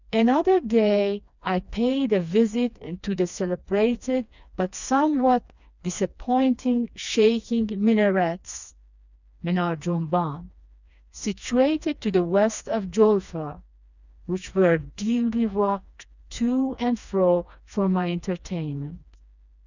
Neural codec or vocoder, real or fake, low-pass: codec, 16 kHz, 2 kbps, FreqCodec, smaller model; fake; 7.2 kHz